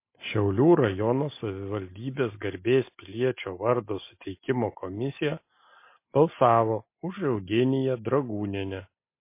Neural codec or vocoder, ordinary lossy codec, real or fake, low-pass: none; MP3, 24 kbps; real; 3.6 kHz